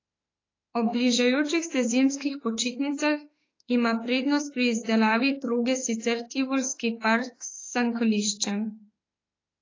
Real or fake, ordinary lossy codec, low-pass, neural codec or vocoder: fake; AAC, 32 kbps; 7.2 kHz; autoencoder, 48 kHz, 32 numbers a frame, DAC-VAE, trained on Japanese speech